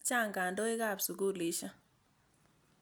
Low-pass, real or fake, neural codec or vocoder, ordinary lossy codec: none; real; none; none